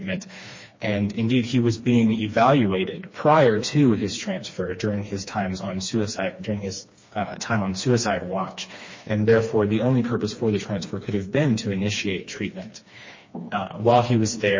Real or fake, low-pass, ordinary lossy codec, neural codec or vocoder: fake; 7.2 kHz; MP3, 32 kbps; codec, 16 kHz, 2 kbps, FreqCodec, smaller model